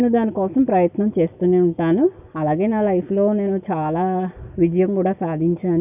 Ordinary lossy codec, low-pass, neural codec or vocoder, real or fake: none; 3.6 kHz; none; real